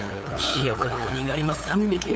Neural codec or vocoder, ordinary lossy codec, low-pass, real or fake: codec, 16 kHz, 8 kbps, FunCodec, trained on LibriTTS, 25 frames a second; none; none; fake